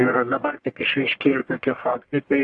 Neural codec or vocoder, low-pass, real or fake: codec, 44.1 kHz, 1.7 kbps, Pupu-Codec; 9.9 kHz; fake